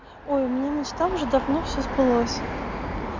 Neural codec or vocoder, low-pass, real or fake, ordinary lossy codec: none; 7.2 kHz; real; MP3, 64 kbps